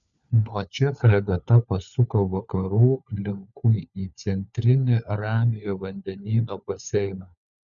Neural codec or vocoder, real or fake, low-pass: codec, 16 kHz, 4 kbps, FunCodec, trained on LibriTTS, 50 frames a second; fake; 7.2 kHz